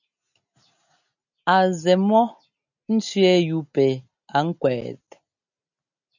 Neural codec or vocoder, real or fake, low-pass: none; real; 7.2 kHz